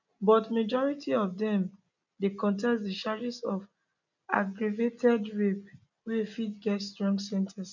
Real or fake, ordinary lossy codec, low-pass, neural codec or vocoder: real; none; 7.2 kHz; none